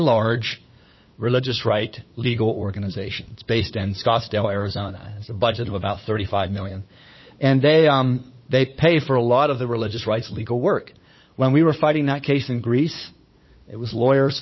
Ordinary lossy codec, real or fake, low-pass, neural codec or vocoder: MP3, 24 kbps; fake; 7.2 kHz; codec, 16 kHz, 8 kbps, FunCodec, trained on LibriTTS, 25 frames a second